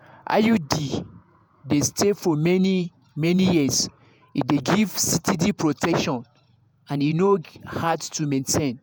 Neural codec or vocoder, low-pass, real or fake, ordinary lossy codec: vocoder, 48 kHz, 128 mel bands, Vocos; none; fake; none